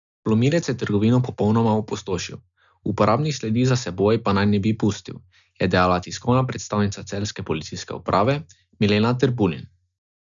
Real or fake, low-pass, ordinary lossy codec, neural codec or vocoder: real; 7.2 kHz; none; none